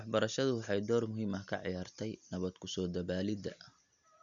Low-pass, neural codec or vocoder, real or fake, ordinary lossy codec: 7.2 kHz; none; real; none